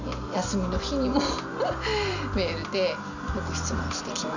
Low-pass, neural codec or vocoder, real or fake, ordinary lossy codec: 7.2 kHz; none; real; none